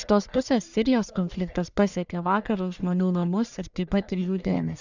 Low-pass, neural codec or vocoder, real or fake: 7.2 kHz; codec, 44.1 kHz, 1.7 kbps, Pupu-Codec; fake